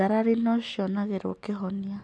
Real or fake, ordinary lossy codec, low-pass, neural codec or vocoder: real; none; none; none